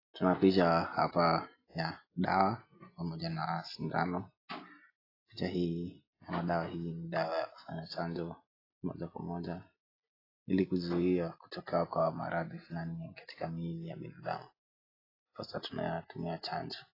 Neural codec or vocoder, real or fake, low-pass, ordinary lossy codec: none; real; 5.4 kHz; AAC, 24 kbps